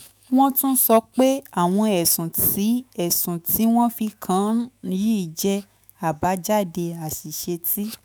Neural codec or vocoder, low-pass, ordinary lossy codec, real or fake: autoencoder, 48 kHz, 128 numbers a frame, DAC-VAE, trained on Japanese speech; none; none; fake